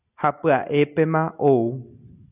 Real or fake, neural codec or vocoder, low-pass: real; none; 3.6 kHz